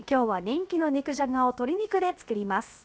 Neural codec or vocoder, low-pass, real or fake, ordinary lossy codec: codec, 16 kHz, 0.7 kbps, FocalCodec; none; fake; none